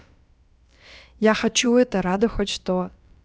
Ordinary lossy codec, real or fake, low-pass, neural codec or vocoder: none; fake; none; codec, 16 kHz, about 1 kbps, DyCAST, with the encoder's durations